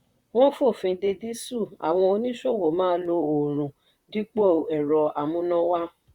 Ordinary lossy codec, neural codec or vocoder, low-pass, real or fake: Opus, 64 kbps; vocoder, 44.1 kHz, 128 mel bands, Pupu-Vocoder; 19.8 kHz; fake